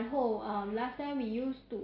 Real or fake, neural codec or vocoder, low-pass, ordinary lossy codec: real; none; 5.4 kHz; AAC, 24 kbps